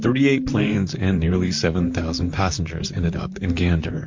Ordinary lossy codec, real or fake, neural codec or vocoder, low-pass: MP3, 48 kbps; fake; vocoder, 44.1 kHz, 128 mel bands, Pupu-Vocoder; 7.2 kHz